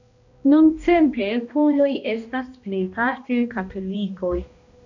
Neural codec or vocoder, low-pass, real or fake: codec, 16 kHz, 1 kbps, X-Codec, HuBERT features, trained on balanced general audio; 7.2 kHz; fake